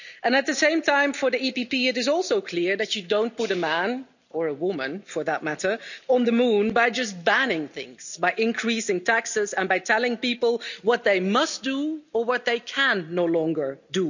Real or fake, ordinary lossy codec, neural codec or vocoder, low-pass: real; none; none; 7.2 kHz